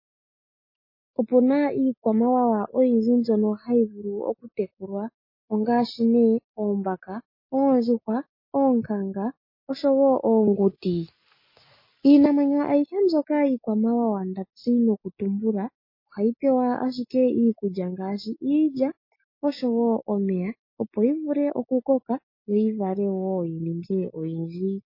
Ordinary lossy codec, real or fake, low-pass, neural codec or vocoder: MP3, 24 kbps; real; 5.4 kHz; none